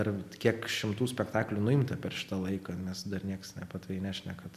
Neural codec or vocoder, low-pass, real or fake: none; 14.4 kHz; real